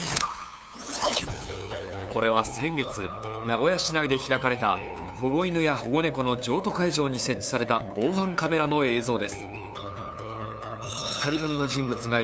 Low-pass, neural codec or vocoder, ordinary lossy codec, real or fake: none; codec, 16 kHz, 2 kbps, FunCodec, trained on LibriTTS, 25 frames a second; none; fake